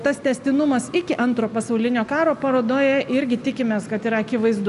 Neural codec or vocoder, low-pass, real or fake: none; 10.8 kHz; real